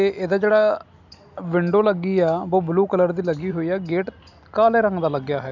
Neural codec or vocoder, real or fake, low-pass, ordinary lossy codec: none; real; 7.2 kHz; none